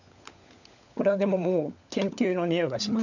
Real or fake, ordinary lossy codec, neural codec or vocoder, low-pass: fake; none; codec, 16 kHz, 16 kbps, FunCodec, trained on LibriTTS, 50 frames a second; 7.2 kHz